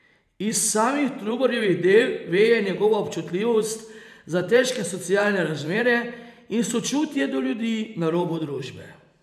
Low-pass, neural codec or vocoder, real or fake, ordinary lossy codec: 14.4 kHz; vocoder, 44.1 kHz, 128 mel bands every 512 samples, BigVGAN v2; fake; none